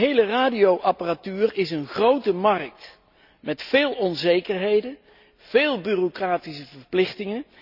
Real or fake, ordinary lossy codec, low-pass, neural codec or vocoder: real; none; 5.4 kHz; none